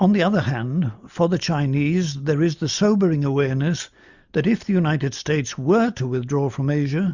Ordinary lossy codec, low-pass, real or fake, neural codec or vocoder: Opus, 64 kbps; 7.2 kHz; real; none